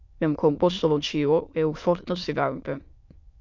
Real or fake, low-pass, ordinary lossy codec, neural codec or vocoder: fake; 7.2 kHz; MP3, 64 kbps; autoencoder, 22.05 kHz, a latent of 192 numbers a frame, VITS, trained on many speakers